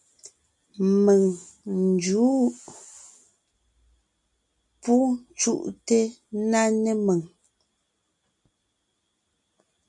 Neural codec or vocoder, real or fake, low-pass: none; real; 10.8 kHz